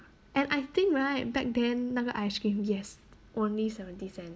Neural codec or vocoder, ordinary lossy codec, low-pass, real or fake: none; none; none; real